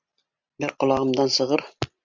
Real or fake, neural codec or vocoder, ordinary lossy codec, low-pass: real; none; MP3, 64 kbps; 7.2 kHz